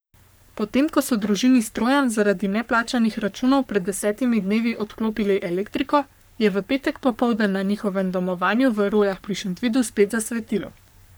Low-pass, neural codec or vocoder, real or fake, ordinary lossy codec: none; codec, 44.1 kHz, 3.4 kbps, Pupu-Codec; fake; none